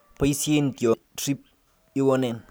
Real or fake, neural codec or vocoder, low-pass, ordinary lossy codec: real; none; none; none